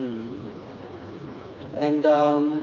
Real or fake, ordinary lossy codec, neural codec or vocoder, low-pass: fake; none; codec, 16 kHz, 2 kbps, FreqCodec, smaller model; 7.2 kHz